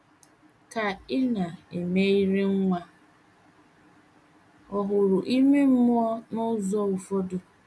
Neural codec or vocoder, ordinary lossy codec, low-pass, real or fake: none; none; none; real